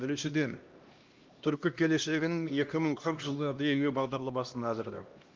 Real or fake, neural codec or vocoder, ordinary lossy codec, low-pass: fake; codec, 16 kHz, 1 kbps, X-Codec, HuBERT features, trained on LibriSpeech; Opus, 24 kbps; 7.2 kHz